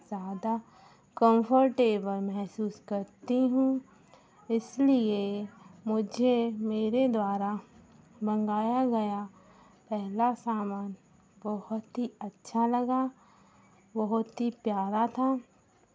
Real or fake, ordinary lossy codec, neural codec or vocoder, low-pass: real; none; none; none